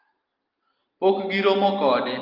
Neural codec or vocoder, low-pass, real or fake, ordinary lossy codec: none; 5.4 kHz; real; Opus, 24 kbps